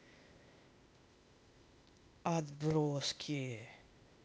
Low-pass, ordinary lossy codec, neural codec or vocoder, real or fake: none; none; codec, 16 kHz, 0.8 kbps, ZipCodec; fake